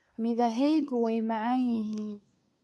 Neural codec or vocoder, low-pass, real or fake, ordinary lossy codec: codec, 24 kHz, 1 kbps, SNAC; none; fake; none